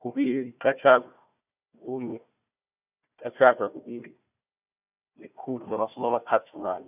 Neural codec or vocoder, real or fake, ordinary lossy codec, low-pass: codec, 16 kHz, 1 kbps, FunCodec, trained on Chinese and English, 50 frames a second; fake; none; 3.6 kHz